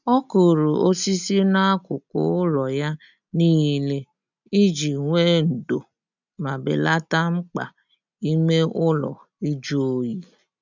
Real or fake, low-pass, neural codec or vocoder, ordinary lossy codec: real; 7.2 kHz; none; none